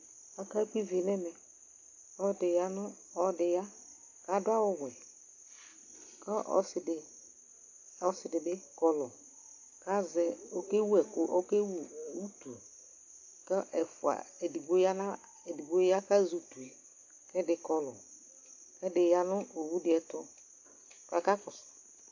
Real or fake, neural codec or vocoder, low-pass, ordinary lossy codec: real; none; 7.2 kHz; AAC, 48 kbps